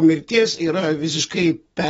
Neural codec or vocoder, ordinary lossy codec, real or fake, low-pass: codec, 44.1 kHz, 7.8 kbps, DAC; AAC, 24 kbps; fake; 19.8 kHz